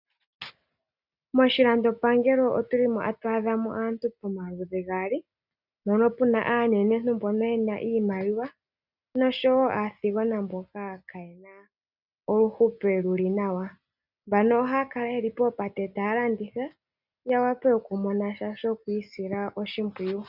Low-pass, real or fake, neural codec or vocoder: 5.4 kHz; real; none